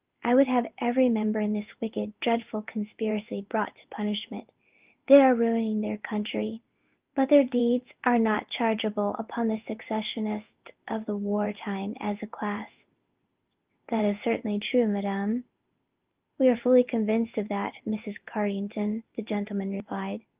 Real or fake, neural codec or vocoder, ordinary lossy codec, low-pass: fake; codec, 16 kHz in and 24 kHz out, 1 kbps, XY-Tokenizer; Opus, 32 kbps; 3.6 kHz